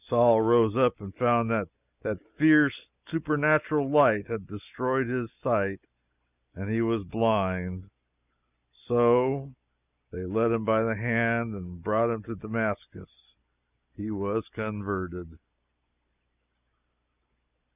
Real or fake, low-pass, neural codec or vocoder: real; 3.6 kHz; none